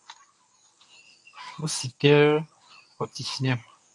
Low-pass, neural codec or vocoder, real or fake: 10.8 kHz; codec, 24 kHz, 0.9 kbps, WavTokenizer, medium speech release version 1; fake